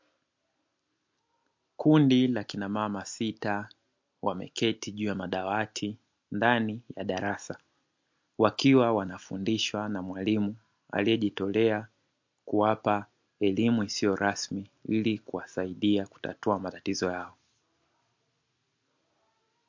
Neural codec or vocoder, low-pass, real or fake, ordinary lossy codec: none; 7.2 kHz; real; MP3, 48 kbps